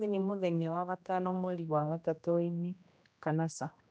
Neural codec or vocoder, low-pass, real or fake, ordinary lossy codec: codec, 16 kHz, 1 kbps, X-Codec, HuBERT features, trained on general audio; none; fake; none